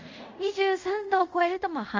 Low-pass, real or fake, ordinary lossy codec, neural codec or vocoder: 7.2 kHz; fake; Opus, 32 kbps; codec, 24 kHz, 0.5 kbps, DualCodec